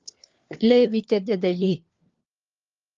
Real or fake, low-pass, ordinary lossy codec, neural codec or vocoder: fake; 7.2 kHz; Opus, 32 kbps; codec, 16 kHz, 4 kbps, FunCodec, trained on LibriTTS, 50 frames a second